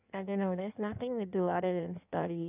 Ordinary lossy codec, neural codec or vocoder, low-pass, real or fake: none; codec, 16 kHz in and 24 kHz out, 1.1 kbps, FireRedTTS-2 codec; 3.6 kHz; fake